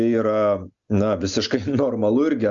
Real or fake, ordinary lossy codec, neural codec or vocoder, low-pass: real; Opus, 64 kbps; none; 7.2 kHz